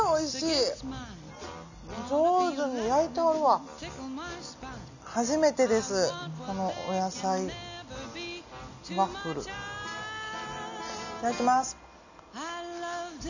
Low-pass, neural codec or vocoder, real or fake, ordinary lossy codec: 7.2 kHz; none; real; none